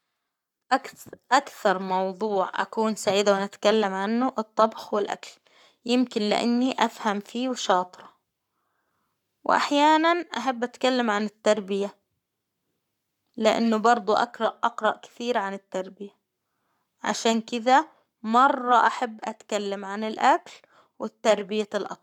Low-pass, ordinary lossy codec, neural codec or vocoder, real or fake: 19.8 kHz; none; codec, 44.1 kHz, 7.8 kbps, Pupu-Codec; fake